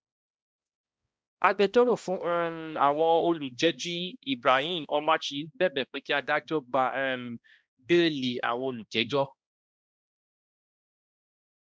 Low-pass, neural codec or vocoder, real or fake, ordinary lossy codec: none; codec, 16 kHz, 1 kbps, X-Codec, HuBERT features, trained on balanced general audio; fake; none